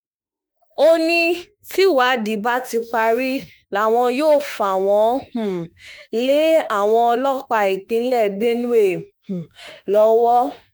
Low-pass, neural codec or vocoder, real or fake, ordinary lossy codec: none; autoencoder, 48 kHz, 32 numbers a frame, DAC-VAE, trained on Japanese speech; fake; none